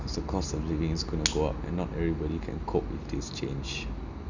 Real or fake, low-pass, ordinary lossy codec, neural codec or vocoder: real; 7.2 kHz; none; none